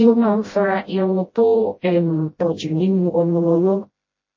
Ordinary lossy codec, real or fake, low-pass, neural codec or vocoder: MP3, 32 kbps; fake; 7.2 kHz; codec, 16 kHz, 0.5 kbps, FreqCodec, smaller model